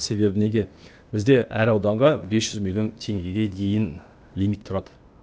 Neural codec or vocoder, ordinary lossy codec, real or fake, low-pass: codec, 16 kHz, 0.8 kbps, ZipCodec; none; fake; none